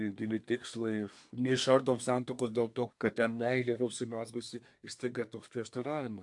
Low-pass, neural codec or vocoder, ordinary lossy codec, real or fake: 10.8 kHz; codec, 24 kHz, 1 kbps, SNAC; AAC, 64 kbps; fake